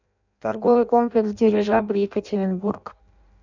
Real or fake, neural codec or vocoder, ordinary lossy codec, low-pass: fake; codec, 16 kHz in and 24 kHz out, 0.6 kbps, FireRedTTS-2 codec; none; 7.2 kHz